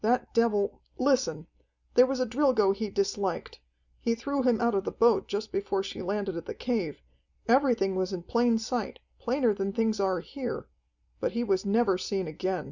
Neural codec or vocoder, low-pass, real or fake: none; 7.2 kHz; real